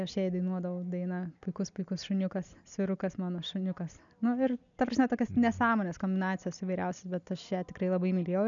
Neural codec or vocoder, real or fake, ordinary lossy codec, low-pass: none; real; MP3, 96 kbps; 7.2 kHz